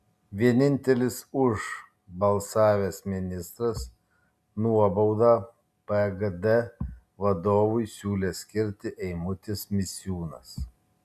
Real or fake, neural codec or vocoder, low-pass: real; none; 14.4 kHz